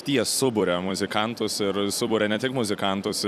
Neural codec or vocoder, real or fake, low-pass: vocoder, 44.1 kHz, 128 mel bands every 256 samples, BigVGAN v2; fake; 14.4 kHz